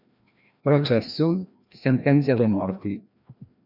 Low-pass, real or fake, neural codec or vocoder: 5.4 kHz; fake; codec, 16 kHz, 1 kbps, FreqCodec, larger model